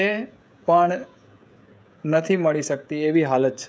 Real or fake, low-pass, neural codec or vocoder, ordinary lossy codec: fake; none; codec, 16 kHz, 16 kbps, FreqCodec, smaller model; none